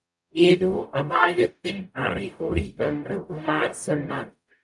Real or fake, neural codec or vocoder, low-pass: fake; codec, 44.1 kHz, 0.9 kbps, DAC; 10.8 kHz